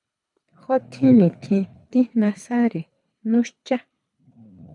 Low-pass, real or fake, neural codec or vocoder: 10.8 kHz; fake; codec, 24 kHz, 3 kbps, HILCodec